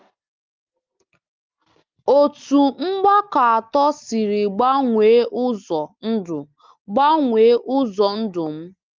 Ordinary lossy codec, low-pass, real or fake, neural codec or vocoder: Opus, 24 kbps; 7.2 kHz; real; none